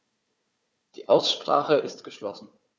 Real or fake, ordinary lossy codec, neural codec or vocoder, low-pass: fake; none; codec, 16 kHz, 4 kbps, FunCodec, trained on Chinese and English, 50 frames a second; none